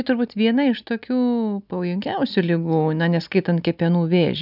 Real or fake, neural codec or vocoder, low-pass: real; none; 5.4 kHz